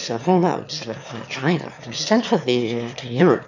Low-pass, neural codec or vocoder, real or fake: 7.2 kHz; autoencoder, 22.05 kHz, a latent of 192 numbers a frame, VITS, trained on one speaker; fake